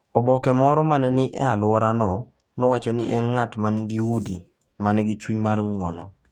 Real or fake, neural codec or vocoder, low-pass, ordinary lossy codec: fake; codec, 44.1 kHz, 2.6 kbps, DAC; 19.8 kHz; none